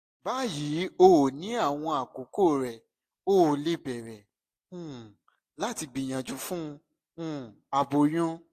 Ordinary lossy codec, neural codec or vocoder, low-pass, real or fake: AAC, 64 kbps; none; 14.4 kHz; real